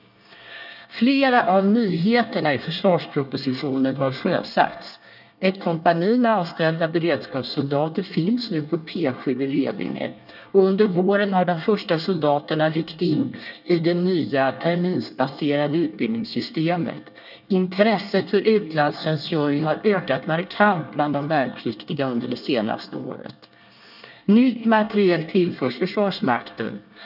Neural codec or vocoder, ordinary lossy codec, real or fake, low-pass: codec, 24 kHz, 1 kbps, SNAC; none; fake; 5.4 kHz